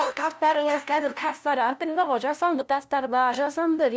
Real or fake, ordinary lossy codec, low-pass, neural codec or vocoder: fake; none; none; codec, 16 kHz, 0.5 kbps, FunCodec, trained on LibriTTS, 25 frames a second